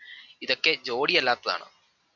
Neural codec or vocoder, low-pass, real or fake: none; 7.2 kHz; real